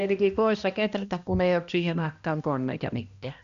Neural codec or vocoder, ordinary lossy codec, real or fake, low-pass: codec, 16 kHz, 1 kbps, X-Codec, HuBERT features, trained on balanced general audio; Opus, 64 kbps; fake; 7.2 kHz